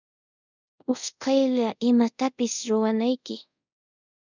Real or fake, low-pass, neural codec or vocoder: fake; 7.2 kHz; codec, 24 kHz, 0.5 kbps, DualCodec